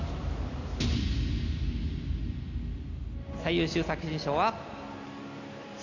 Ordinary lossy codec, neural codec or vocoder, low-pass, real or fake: none; none; 7.2 kHz; real